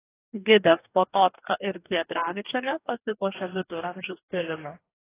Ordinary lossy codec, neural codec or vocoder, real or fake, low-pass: AAC, 16 kbps; codec, 44.1 kHz, 2.6 kbps, DAC; fake; 3.6 kHz